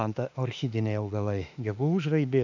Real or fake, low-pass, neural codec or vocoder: fake; 7.2 kHz; autoencoder, 48 kHz, 32 numbers a frame, DAC-VAE, trained on Japanese speech